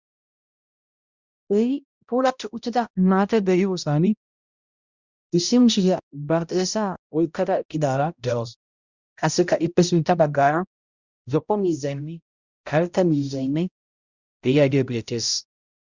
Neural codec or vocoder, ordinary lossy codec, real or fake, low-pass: codec, 16 kHz, 0.5 kbps, X-Codec, HuBERT features, trained on balanced general audio; Opus, 64 kbps; fake; 7.2 kHz